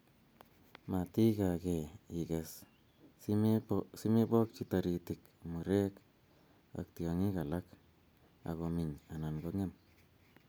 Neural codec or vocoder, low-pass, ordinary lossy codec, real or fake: none; none; none; real